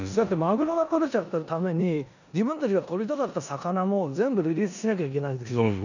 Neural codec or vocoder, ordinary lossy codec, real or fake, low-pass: codec, 16 kHz in and 24 kHz out, 0.9 kbps, LongCat-Audio-Codec, four codebook decoder; none; fake; 7.2 kHz